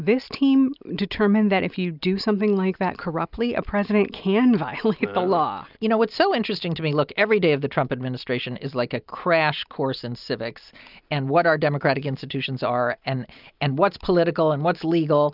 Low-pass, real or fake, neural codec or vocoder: 5.4 kHz; real; none